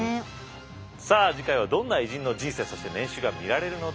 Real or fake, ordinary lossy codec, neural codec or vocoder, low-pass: real; none; none; none